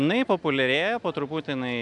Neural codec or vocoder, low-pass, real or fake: none; 10.8 kHz; real